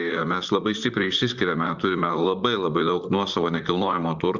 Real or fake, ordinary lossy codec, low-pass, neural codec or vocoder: fake; Opus, 64 kbps; 7.2 kHz; vocoder, 44.1 kHz, 128 mel bands, Pupu-Vocoder